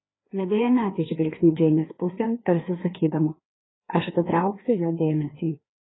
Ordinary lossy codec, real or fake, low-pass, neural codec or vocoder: AAC, 16 kbps; fake; 7.2 kHz; codec, 16 kHz, 2 kbps, FreqCodec, larger model